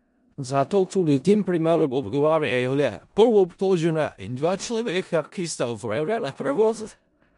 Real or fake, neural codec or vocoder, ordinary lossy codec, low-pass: fake; codec, 16 kHz in and 24 kHz out, 0.4 kbps, LongCat-Audio-Codec, four codebook decoder; MP3, 64 kbps; 10.8 kHz